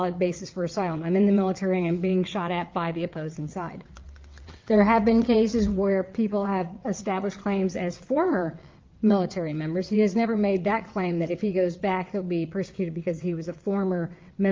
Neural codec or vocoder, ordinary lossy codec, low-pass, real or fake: vocoder, 22.05 kHz, 80 mel bands, WaveNeXt; Opus, 24 kbps; 7.2 kHz; fake